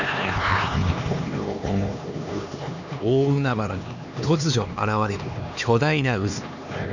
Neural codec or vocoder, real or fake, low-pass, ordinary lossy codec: codec, 16 kHz, 2 kbps, X-Codec, HuBERT features, trained on LibriSpeech; fake; 7.2 kHz; none